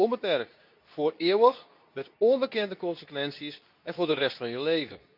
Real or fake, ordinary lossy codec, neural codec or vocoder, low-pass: fake; none; codec, 24 kHz, 0.9 kbps, WavTokenizer, medium speech release version 2; 5.4 kHz